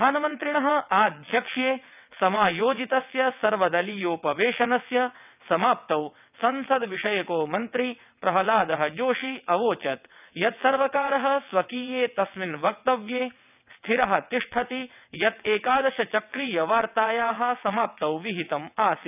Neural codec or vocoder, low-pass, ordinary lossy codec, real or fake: vocoder, 22.05 kHz, 80 mel bands, WaveNeXt; 3.6 kHz; none; fake